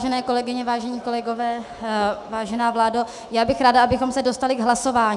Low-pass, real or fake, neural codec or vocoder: 10.8 kHz; fake; autoencoder, 48 kHz, 128 numbers a frame, DAC-VAE, trained on Japanese speech